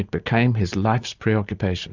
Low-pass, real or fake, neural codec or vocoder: 7.2 kHz; real; none